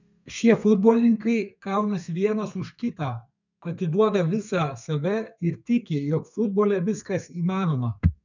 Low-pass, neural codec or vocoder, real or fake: 7.2 kHz; codec, 32 kHz, 1.9 kbps, SNAC; fake